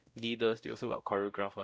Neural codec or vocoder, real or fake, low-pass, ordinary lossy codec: codec, 16 kHz, 0.5 kbps, X-Codec, WavLM features, trained on Multilingual LibriSpeech; fake; none; none